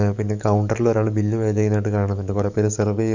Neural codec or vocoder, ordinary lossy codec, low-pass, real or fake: codec, 44.1 kHz, 7.8 kbps, DAC; none; 7.2 kHz; fake